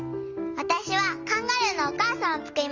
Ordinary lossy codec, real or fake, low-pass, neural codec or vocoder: Opus, 32 kbps; real; 7.2 kHz; none